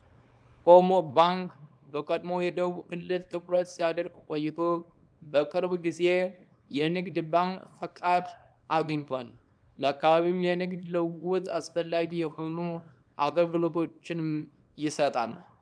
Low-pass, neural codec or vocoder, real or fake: 9.9 kHz; codec, 24 kHz, 0.9 kbps, WavTokenizer, small release; fake